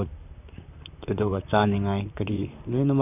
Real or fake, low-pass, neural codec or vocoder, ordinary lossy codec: fake; 3.6 kHz; vocoder, 44.1 kHz, 128 mel bands, Pupu-Vocoder; none